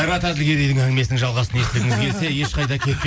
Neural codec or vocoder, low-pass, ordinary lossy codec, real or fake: none; none; none; real